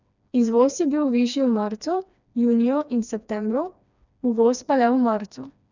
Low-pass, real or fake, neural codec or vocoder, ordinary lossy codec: 7.2 kHz; fake; codec, 16 kHz, 2 kbps, FreqCodec, smaller model; none